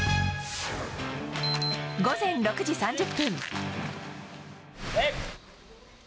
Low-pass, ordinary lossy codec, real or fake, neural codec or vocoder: none; none; real; none